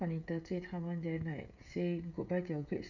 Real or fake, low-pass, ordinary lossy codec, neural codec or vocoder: fake; 7.2 kHz; none; codec, 16 kHz, 16 kbps, FreqCodec, smaller model